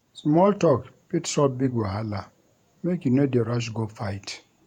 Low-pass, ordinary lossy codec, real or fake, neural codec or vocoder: 19.8 kHz; none; fake; vocoder, 48 kHz, 128 mel bands, Vocos